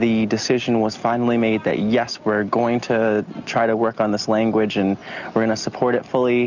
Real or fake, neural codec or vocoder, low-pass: real; none; 7.2 kHz